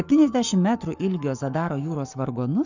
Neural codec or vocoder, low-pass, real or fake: codec, 44.1 kHz, 7.8 kbps, Pupu-Codec; 7.2 kHz; fake